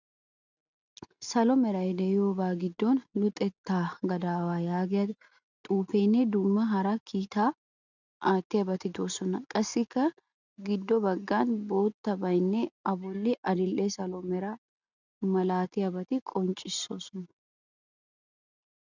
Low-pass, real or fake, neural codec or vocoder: 7.2 kHz; real; none